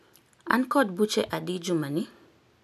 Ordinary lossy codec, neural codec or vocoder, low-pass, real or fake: none; none; 14.4 kHz; real